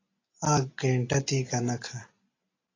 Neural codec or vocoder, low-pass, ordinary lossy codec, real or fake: none; 7.2 kHz; AAC, 32 kbps; real